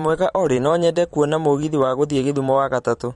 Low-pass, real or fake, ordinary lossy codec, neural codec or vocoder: 19.8 kHz; fake; MP3, 48 kbps; vocoder, 44.1 kHz, 128 mel bands every 512 samples, BigVGAN v2